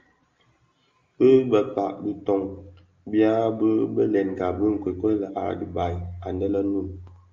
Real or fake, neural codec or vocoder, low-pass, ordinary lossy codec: real; none; 7.2 kHz; Opus, 32 kbps